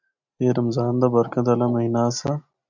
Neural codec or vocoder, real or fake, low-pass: none; real; 7.2 kHz